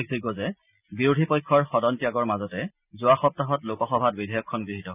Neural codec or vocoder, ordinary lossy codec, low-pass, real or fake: none; none; 3.6 kHz; real